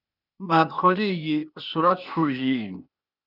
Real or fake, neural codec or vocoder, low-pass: fake; codec, 16 kHz, 0.8 kbps, ZipCodec; 5.4 kHz